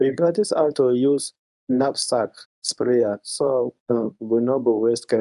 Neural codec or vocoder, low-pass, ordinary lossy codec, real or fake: codec, 24 kHz, 0.9 kbps, WavTokenizer, medium speech release version 1; 10.8 kHz; none; fake